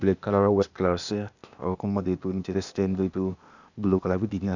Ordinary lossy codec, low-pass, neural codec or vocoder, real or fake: none; 7.2 kHz; codec, 16 kHz, 0.8 kbps, ZipCodec; fake